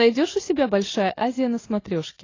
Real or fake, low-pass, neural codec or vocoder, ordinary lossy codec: real; 7.2 kHz; none; AAC, 32 kbps